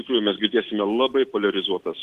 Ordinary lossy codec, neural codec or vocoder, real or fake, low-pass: Opus, 16 kbps; none; real; 14.4 kHz